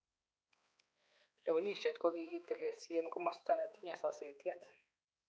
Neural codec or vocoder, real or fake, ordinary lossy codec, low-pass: codec, 16 kHz, 2 kbps, X-Codec, HuBERT features, trained on balanced general audio; fake; none; none